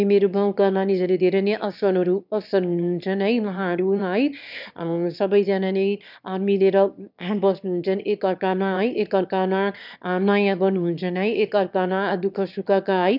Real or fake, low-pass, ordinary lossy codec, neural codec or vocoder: fake; 5.4 kHz; none; autoencoder, 22.05 kHz, a latent of 192 numbers a frame, VITS, trained on one speaker